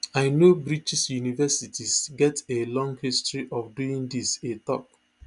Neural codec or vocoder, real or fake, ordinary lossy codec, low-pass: none; real; none; 10.8 kHz